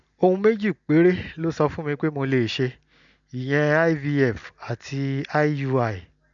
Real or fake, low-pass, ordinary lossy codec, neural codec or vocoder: real; 7.2 kHz; none; none